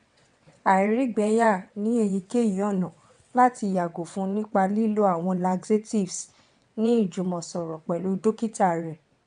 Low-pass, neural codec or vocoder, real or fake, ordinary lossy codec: 9.9 kHz; vocoder, 22.05 kHz, 80 mel bands, WaveNeXt; fake; none